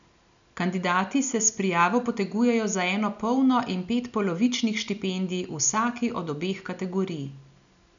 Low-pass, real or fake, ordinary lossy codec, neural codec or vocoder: 7.2 kHz; real; none; none